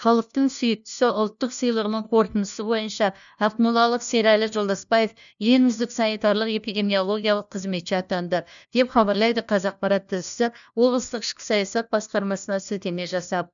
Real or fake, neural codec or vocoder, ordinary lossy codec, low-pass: fake; codec, 16 kHz, 1 kbps, FunCodec, trained on LibriTTS, 50 frames a second; none; 7.2 kHz